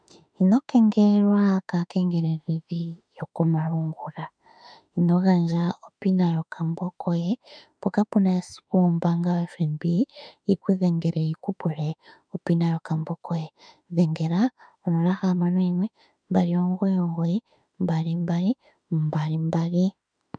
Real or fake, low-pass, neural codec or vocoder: fake; 9.9 kHz; autoencoder, 48 kHz, 32 numbers a frame, DAC-VAE, trained on Japanese speech